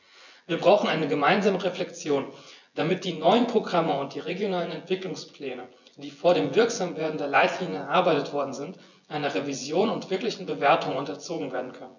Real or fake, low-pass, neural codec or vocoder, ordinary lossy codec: fake; 7.2 kHz; vocoder, 24 kHz, 100 mel bands, Vocos; none